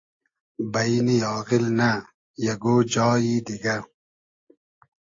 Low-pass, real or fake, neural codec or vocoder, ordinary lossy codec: 7.2 kHz; real; none; AAC, 48 kbps